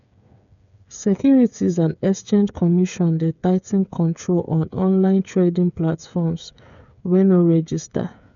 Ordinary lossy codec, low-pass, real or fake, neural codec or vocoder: none; 7.2 kHz; fake; codec, 16 kHz, 8 kbps, FreqCodec, smaller model